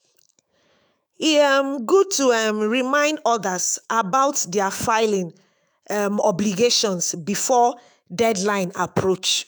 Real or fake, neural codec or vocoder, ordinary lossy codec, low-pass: fake; autoencoder, 48 kHz, 128 numbers a frame, DAC-VAE, trained on Japanese speech; none; none